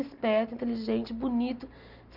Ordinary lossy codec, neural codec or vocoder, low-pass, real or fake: none; none; 5.4 kHz; real